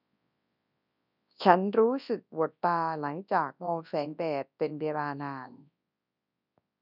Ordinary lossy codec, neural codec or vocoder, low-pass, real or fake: none; codec, 24 kHz, 0.9 kbps, WavTokenizer, large speech release; 5.4 kHz; fake